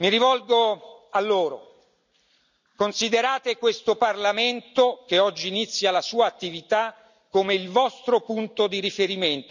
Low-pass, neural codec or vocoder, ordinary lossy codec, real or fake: 7.2 kHz; none; none; real